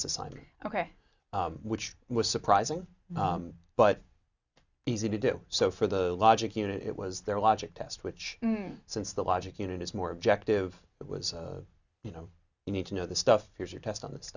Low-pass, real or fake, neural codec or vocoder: 7.2 kHz; real; none